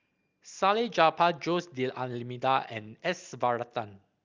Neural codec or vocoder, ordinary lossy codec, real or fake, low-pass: none; Opus, 24 kbps; real; 7.2 kHz